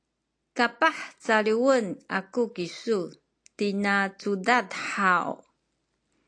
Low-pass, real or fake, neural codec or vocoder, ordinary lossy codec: 9.9 kHz; real; none; AAC, 48 kbps